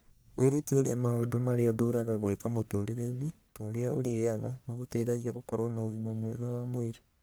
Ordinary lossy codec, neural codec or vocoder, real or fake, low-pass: none; codec, 44.1 kHz, 1.7 kbps, Pupu-Codec; fake; none